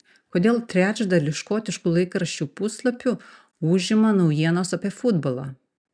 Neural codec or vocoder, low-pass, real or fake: none; 9.9 kHz; real